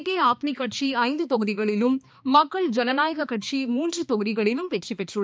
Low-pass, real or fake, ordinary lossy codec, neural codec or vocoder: none; fake; none; codec, 16 kHz, 2 kbps, X-Codec, HuBERT features, trained on balanced general audio